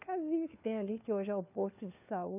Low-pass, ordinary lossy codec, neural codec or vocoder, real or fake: 3.6 kHz; none; codec, 16 kHz, 4 kbps, FunCodec, trained on LibriTTS, 50 frames a second; fake